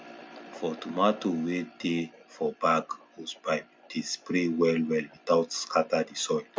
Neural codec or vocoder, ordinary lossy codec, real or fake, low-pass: none; none; real; none